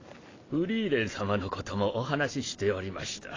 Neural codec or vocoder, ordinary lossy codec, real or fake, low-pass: none; AAC, 32 kbps; real; 7.2 kHz